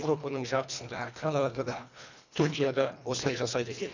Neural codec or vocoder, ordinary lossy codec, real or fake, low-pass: codec, 24 kHz, 1.5 kbps, HILCodec; none; fake; 7.2 kHz